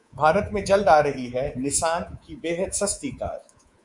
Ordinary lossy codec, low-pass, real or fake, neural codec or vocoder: Opus, 64 kbps; 10.8 kHz; fake; codec, 24 kHz, 3.1 kbps, DualCodec